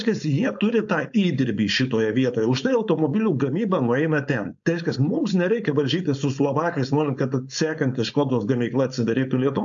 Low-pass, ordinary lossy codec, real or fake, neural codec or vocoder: 7.2 kHz; AAC, 64 kbps; fake; codec, 16 kHz, 4.8 kbps, FACodec